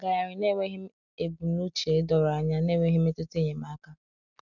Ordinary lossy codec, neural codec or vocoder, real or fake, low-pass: none; none; real; 7.2 kHz